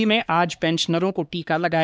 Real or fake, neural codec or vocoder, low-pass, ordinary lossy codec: fake; codec, 16 kHz, 2 kbps, X-Codec, HuBERT features, trained on LibriSpeech; none; none